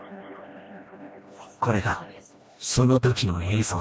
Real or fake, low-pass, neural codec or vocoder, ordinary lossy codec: fake; none; codec, 16 kHz, 1 kbps, FreqCodec, smaller model; none